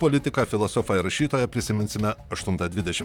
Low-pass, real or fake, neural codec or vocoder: 19.8 kHz; fake; vocoder, 44.1 kHz, 128 mel bands, Pupu-Vocoder